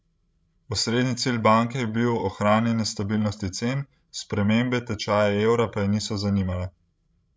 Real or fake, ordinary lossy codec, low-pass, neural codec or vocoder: fake; none; none; codec, 16 kHz, 16 kbps, FreqCodec, larger model